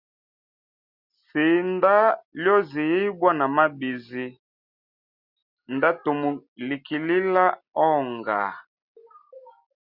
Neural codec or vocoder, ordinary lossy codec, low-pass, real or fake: none; Opus, 64 kbps; 5.4 kHz; real